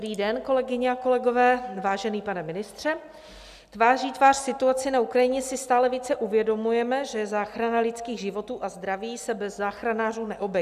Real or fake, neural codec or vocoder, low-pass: real; none; 14.4 kHz